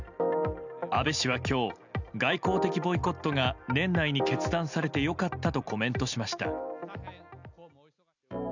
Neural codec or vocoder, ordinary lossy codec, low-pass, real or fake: none; none; 7.2 kHz; real